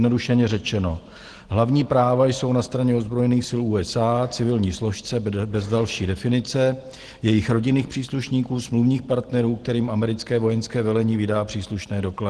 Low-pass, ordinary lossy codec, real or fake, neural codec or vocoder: 10.8 kHz; Opus, 16 kbps; real; none